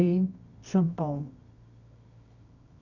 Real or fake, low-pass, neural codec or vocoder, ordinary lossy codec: fake; 7.2 kHz; codec, 24 kHz, 0.9 kbps, WavTokenizer, medium music audio release; none